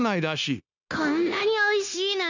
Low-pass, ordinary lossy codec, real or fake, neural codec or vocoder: 7.2 kHz; none; fake; codec, 16 kHz, 0.9 kbps, LongCat-Audio-Codec